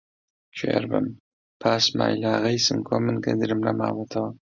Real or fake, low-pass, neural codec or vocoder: real; 7.2 kHz; none